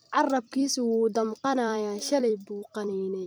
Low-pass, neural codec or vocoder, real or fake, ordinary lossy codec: none; vocoder, 44.1 kHz, 128 mel bands every 256 samples, BigVGAN v2; fake; none